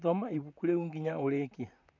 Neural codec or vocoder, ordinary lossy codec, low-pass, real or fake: vocoder, 44.1 kHz, 128 mel bands, Pupu-Vocoder; none; 7.2 kHz; fake